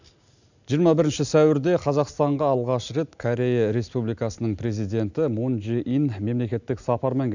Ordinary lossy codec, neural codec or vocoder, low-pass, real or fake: none; none; 7.2 kHz; real